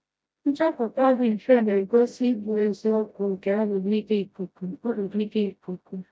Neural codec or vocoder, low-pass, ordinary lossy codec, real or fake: codec, 16 kHz, 0.5 kbps, FreqCodec, smaller model; none; none; fake